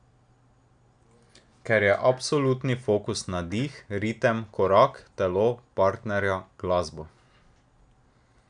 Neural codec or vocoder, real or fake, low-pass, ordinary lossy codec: none; real; 9.9 kHz; none